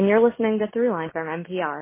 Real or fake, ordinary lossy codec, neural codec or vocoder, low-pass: real; MP3, 24 kbps; none; 3.6 kHz